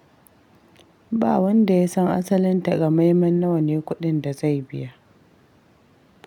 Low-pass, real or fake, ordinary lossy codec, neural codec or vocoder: 19.8 kHz; real; none; none